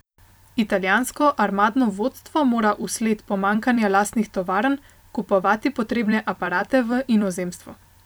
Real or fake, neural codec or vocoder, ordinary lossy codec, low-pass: fake; vocoder, 44.1 kHz, 128 mel bands every 256 samples, BigVGAN v2; none; none